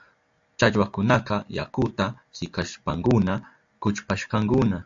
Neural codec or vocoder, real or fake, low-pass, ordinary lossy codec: none; real; 7.2 kHz; AAC, 64 kbps